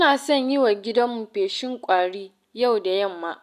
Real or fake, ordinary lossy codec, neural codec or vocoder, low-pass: real; none; none; 14.4 kHz